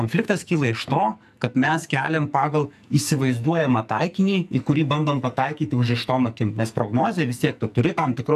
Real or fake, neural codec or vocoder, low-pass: fake; codec, 44.1 kHz, 2.6 kbps, SNAC; 14.4 kHz